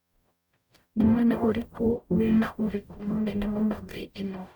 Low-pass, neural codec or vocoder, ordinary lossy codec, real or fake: 19.8 kHz; codec, 44.1 kHz, 0.9 kbps, DAC; none; fake